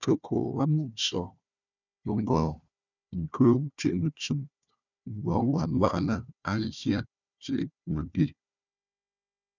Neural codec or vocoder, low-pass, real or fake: codec, 16 kHz, 1 kbps, FunCodec, trained on Chinese and English, 50 frames a second; 7.2 kHz; fake